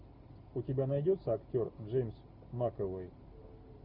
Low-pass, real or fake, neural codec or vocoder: 5.4 kHz; real; none